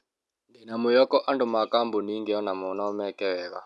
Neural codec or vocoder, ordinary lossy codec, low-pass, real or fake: none; none; none; real